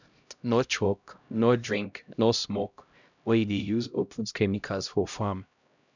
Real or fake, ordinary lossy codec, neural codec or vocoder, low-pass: fake; none; codec, 16 kHz, 0.5 kbps, X-Codec, HuBERT features, trained on LibriSpeech; 7.2 kHz